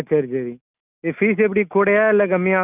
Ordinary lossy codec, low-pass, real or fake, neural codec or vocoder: none; 3.6 kHz; real; none